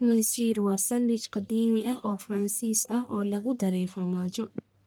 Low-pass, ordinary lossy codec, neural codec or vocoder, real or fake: none; none; codec, 44.1 kHz, 1.7 kbps, Pupu-Codec; fake